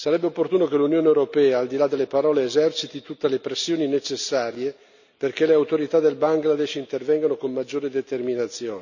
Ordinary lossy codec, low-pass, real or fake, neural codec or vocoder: none; 7.2 kHz; real; none